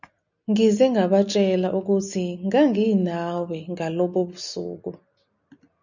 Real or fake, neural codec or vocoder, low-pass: real; none; 7.2 kHz